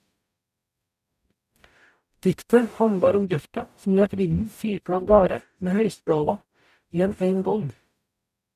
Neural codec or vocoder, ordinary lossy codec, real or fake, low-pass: codec, 44.1 kHz, 0.9 kbps, DAC; none; fake; 14.4 kHz